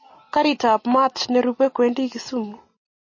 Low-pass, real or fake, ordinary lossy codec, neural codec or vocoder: 7.2 kHz; real; MP3, 32 kbps; none